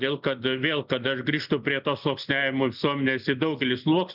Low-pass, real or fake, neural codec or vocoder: 5.4 kHz; real; none